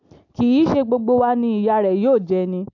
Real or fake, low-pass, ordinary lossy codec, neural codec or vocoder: real; 7.2 kHz; AAC, 48 kbps; none